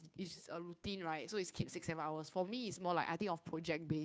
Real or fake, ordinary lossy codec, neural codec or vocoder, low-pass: fake; none; codec, 16 kHz, 2 kbps, FunCodec, trained on Chinese and English, 25 frames a second; none